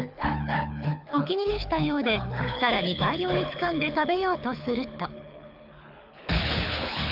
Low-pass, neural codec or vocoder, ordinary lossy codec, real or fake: 5.4 kHz; codec, 24 kHz, 6 kbps, HILCodec; AAC, 48 kbps; fake